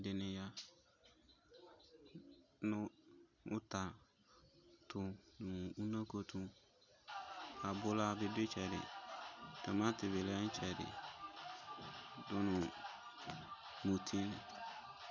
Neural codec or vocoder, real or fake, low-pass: none; real; 7.2 kHz